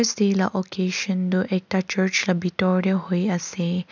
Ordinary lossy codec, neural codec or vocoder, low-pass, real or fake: none; none; 7.2 kHz; real